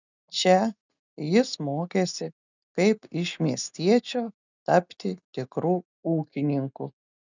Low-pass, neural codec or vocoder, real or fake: 7.2 kHz; none; real